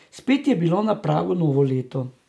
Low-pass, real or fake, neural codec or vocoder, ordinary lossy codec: none; real; none; none